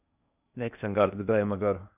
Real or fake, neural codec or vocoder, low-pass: fake; codec, 16 kHz in and 24 kHz out, 0.6 kbps, FocalCodec, streaming, 2048 codes; 3.6 kHz